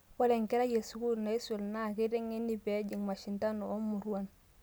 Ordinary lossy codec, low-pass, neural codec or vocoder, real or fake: none; none; none; real